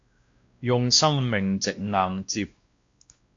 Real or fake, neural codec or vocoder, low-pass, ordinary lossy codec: fake; codec, 16 kHz, 1 kbps, X-Codec, WavLM features, trained on Multilingual LibriSpeech; 7.2 kHz; AAC, 48 kbps